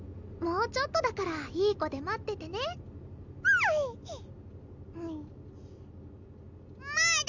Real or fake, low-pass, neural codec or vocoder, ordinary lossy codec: real; 7.2 kHz; none; none